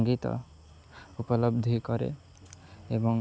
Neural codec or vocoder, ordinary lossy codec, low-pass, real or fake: none; none; none; real